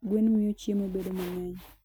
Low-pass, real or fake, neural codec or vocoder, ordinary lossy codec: none; real; none; none